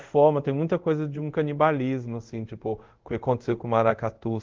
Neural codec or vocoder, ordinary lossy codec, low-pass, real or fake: codec, 24 kHz, 0.5 kbps, DualCodec; Opus, 16 kbps; 7.2 kHz; fake